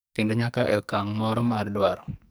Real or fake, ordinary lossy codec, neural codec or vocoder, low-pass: fake; none; codec, 44.1 kHz, 2.6 kbps, SNAC; none